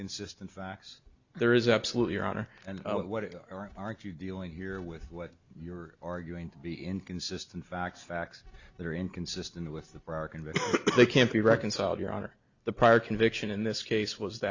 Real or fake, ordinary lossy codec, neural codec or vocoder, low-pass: real; Opus, 64 kbps; none; 7.2 kHz